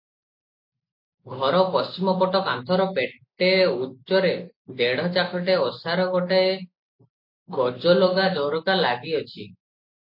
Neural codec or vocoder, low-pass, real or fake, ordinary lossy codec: none; 5.4 kHz; real; MP3, 32 kbps